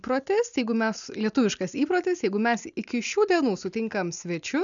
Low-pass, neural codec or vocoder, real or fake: 7.2 kHz; none; real